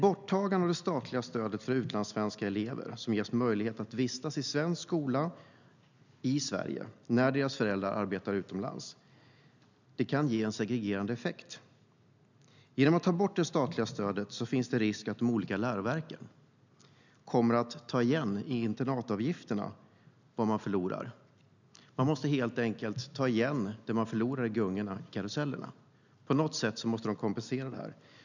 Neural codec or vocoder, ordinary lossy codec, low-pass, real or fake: none; none; 7.2 kHz; real